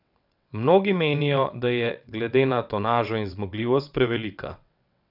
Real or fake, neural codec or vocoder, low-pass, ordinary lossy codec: fake; vocoder, 22.05 kHz, 80 mel bands, WaveNeXt; 5.4 kHz; none